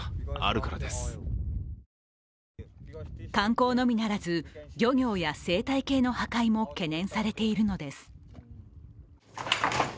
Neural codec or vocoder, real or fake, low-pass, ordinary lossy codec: none; real; none; none